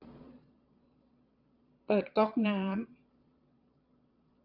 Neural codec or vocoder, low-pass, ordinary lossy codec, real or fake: vocoder, 22.05 kHz, 80 mel bands, Vocos; 5.4 kHz; AAC, 48 kbps; fake